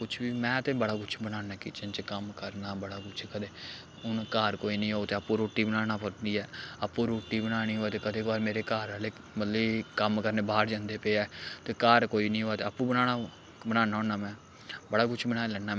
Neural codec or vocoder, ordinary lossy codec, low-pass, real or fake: none; none; none; real